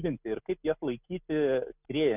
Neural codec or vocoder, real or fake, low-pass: none; real; 3.6 kHz